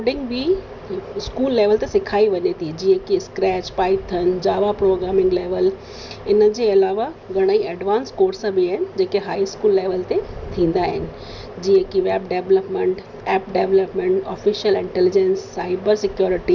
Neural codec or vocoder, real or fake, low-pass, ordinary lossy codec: none; real; 7.2 kHz; none